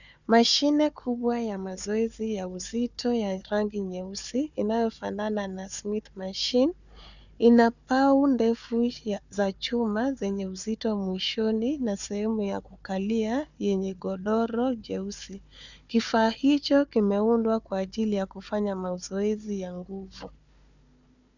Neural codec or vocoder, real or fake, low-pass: codec, 16 kHz, 4 kbps, FunCodec, trained on Chinese and English, 50 frames a second; fake; 7.2 kHz